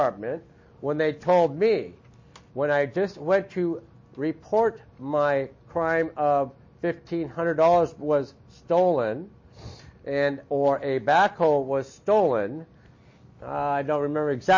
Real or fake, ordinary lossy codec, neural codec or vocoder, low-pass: real; MP3, 32 kbps; none; 7.2 kHz